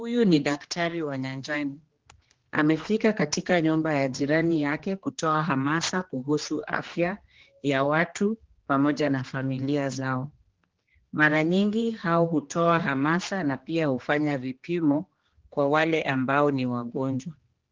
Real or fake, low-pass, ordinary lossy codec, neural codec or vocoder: fake; 7.2 kHz; Opus, 16 kbps; codec, 16 kHz, 2 kbps, X-Codec, HuBERT features, trained on general audio